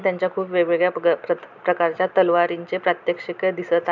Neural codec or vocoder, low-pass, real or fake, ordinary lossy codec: none; 7.2 kHz; real; none